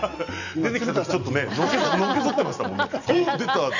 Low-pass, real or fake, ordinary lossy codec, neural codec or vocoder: 7.2 kHz; real; none; none